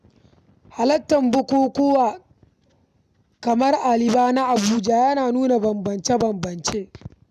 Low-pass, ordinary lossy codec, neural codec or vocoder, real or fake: 14.4 kHz; none; none; real